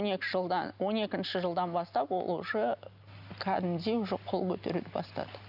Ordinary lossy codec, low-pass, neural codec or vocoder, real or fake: none; 5.4 kHz; none; real